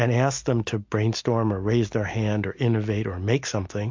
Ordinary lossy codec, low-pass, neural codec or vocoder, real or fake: MP3, 48 kbps; 7.2 kHz; none; real